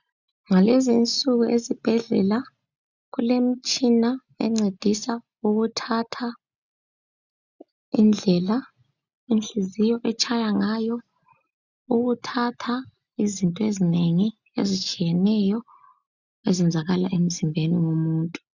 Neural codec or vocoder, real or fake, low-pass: none; real; 7.2 kHz